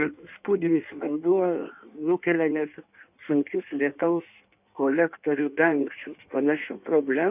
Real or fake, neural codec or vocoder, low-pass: fake; codec, 16 kHz in and 24 kHz out, 1.1 kbps, FireRedTTS-2 codec; 3.6 kHz